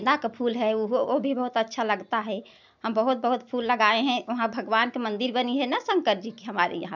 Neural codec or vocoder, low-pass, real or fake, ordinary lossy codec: none; 7.2 kHz; real; none